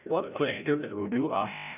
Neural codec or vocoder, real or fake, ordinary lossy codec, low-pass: codec, 16 kHz, 0.5 kbps, FreqCodec, larger model; fake; none; 3.6 kHz